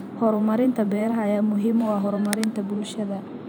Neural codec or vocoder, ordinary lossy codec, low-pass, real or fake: vocoder, 44.1 kHz, 128 mel bands every 512 samples, BigVGAN v2; none; none; fake